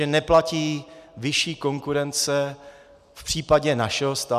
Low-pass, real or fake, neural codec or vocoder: 14.4 kHz; real; none